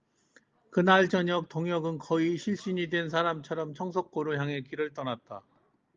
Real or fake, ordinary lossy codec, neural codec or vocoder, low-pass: real; Opus, 32 kbps; none; 7.2 kHz